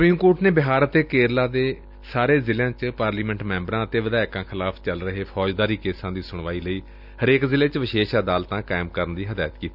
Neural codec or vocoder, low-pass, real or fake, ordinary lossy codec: none; 5.4 kHz; real; none